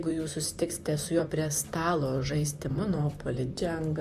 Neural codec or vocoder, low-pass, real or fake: vocoder, 44.1 kHz, 128 mel bands, Pupu-Vocoder; 14.4 kHz; fake